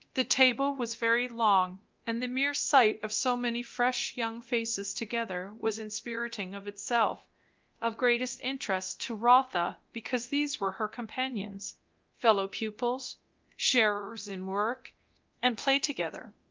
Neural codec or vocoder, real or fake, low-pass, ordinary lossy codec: codec, 24 kHz, 0.9 kbps, DualCodec; fake; 7.2 kHz; Opus, 32 kbps